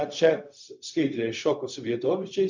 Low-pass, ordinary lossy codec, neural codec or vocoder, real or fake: 7.2 kHz; MP3, 64 kbps; codec, 16 kHz, 0.4 kbps, LongCat-Audio-Codec; fake